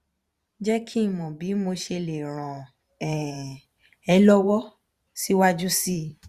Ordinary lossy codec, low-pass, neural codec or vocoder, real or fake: Opus, 64 kbps; 14.4 kHz; vocoder, 44.1 kHz, 128 mel bands every 512 samples, BigVGAN v2; fake